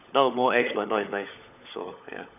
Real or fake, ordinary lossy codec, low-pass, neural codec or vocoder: fake; none; 3.6 kHz; codec, 16 kHz, 16 kbps, FunCodec, trained on LibriTTS, 50 frames a second